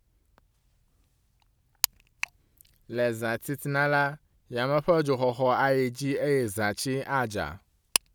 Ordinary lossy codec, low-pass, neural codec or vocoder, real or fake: none; none; none; real